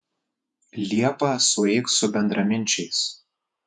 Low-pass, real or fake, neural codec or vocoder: 10.8 kHz; fake; vocoder, 48 kHz, 128 mel bands, Vocos